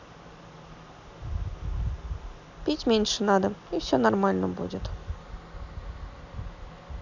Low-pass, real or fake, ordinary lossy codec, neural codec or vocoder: 7.2 kHz; real; none; none